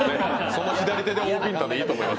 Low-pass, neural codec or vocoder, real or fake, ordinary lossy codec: none; none; real; none